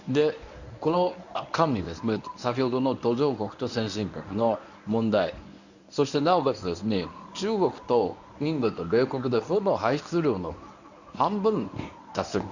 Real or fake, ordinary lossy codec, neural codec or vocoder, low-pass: fake; none; codec, 24 kHz, 0.9 kbps, WavTokenizer, medium speech release version 1; 7.2 kHz